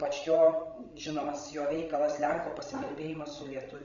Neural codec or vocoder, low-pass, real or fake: codec, 16 kHz, 16 kbps, FreqCodec, larger model; 7.2 kHz; fake